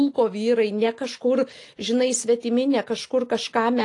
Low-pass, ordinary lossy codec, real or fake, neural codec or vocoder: 10.8 kHz; AAC, 48 kbps; real; none